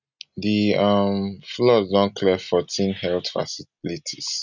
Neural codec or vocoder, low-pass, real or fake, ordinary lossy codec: none; 7.2 kHz; real; none